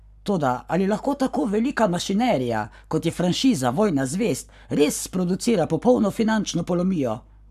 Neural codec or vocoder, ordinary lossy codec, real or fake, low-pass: codec, 44.1 kHz, 7.8 kbps, Pupu-Codec; none; fake; 14.4 kHz